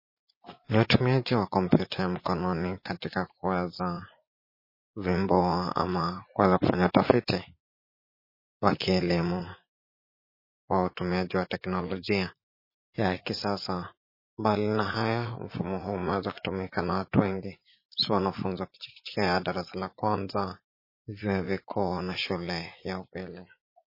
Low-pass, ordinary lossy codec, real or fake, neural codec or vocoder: 5.4 kHz; MP3, 24 kbps; fake; vocoder, 44.1 kHz, 80 mel bands, Vocos